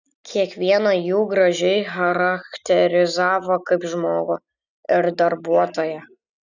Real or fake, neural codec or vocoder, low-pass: real; none; 7.2 kHz